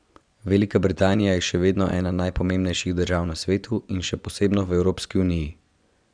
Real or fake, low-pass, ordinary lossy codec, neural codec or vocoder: fake; 9.9 kHz; none; vocoder, 24 kHz, 100 mel bands, Vocos